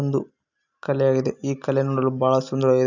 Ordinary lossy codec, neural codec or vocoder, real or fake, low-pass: none; none; real; 7.2 kHz